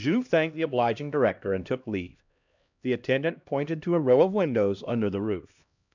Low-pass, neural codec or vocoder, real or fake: 7.2 kHz; codec, 16 kHz, 1 kbps, X-Codec, HuBERT features, trained on LibriSpeech; fake